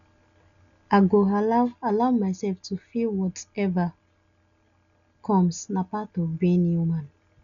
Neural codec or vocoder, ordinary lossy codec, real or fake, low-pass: none; none; real; 7.2 kHz